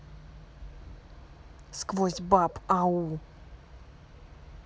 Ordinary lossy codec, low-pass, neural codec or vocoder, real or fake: none; none; none; real